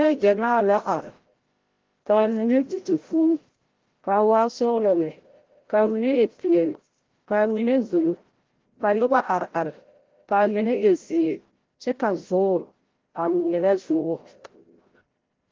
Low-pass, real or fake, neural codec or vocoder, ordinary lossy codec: 7.2 kHz; fake; codec, 16 kHz, 0.5 kbps, FreqCodec, larger model; Opus, 16 kbps